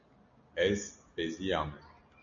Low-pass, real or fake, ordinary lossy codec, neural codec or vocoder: 7.2 kHz; real; MP3, 96 kbps; none